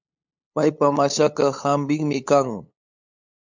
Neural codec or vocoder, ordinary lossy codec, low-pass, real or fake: codec, 16 kHz, 8 kbps, FunCodec, trained on LibriTTS, 25 frames a second; MP3, 64 kbps; 7.2 kHz; fake